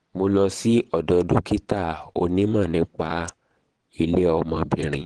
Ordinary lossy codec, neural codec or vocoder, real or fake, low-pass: Opus, 16 kbps; vocoder, 22.05 kHz, 80 mel bands, WaveNeXt; fake; 9.9 kHz